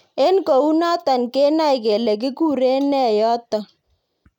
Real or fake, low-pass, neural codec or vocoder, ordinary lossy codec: real; 19.8 kHz; none; none